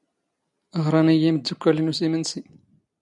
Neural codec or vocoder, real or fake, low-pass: none; real; 10.8 kHz